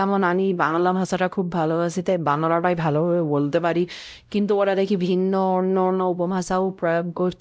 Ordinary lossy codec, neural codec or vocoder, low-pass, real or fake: none; codec, 16 kHz, 0.5 kbps, X-Codec, WavLM features, trained on Multilingual LibriSpeech; none; fake